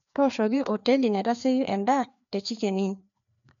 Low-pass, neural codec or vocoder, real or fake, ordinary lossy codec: 7.2 kHz; codec, 16 kHz, 2 kbps, FreqCodec, larger model; fake; none